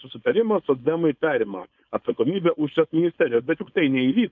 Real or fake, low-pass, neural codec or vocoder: fake; 7.2 kHz; codec, 16 kHz, 4.8 kbps, FACodec